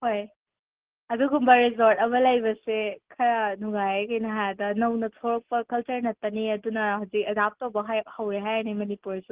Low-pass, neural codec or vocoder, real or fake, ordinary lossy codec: 3.6 kHz; none; real; Opus, 16 kbps